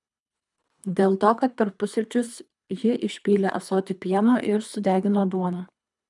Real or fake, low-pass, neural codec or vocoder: fake; 10.8 kHz; codec, 24 kHz, 3 kbps, HILCodec